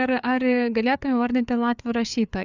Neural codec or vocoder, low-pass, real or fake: codec, 16 kHz, 4 kbps, FreqCodec, larger model; 7.2 kHz; fake